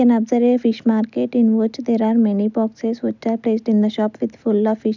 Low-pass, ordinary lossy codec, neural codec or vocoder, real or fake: 7.2 kHz; none; none; real